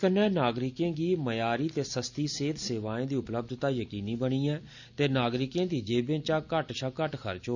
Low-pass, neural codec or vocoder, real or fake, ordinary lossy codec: 7.2 kHz; none; real; none